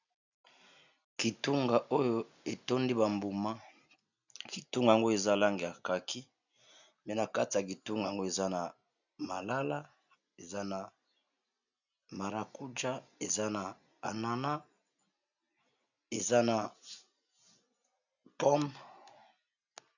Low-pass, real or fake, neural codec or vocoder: 7.2 kHz; real; none